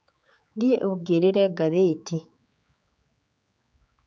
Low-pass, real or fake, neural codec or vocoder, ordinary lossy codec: none; fake; codec, 16 kHz, 4 kbps, X-Codec, HuBERT features, trained on general audio; none